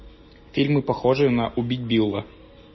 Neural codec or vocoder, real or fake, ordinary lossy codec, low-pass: none; real; MP3, 24 kbps; 7.2 kHz